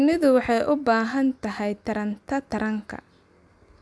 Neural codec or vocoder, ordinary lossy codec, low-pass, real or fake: none; none; none; real